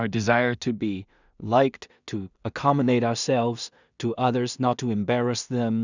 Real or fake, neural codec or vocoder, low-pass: fake; codec, 16 kHz in and 24 kHz out, 0.4 kbps, LongCat-Audio-Codec, two codebook decoder; 7.2 kHz